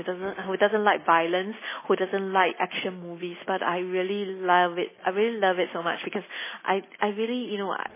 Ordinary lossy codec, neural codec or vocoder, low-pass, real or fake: MP3, 16 kbps; none; 3.6 kHz; real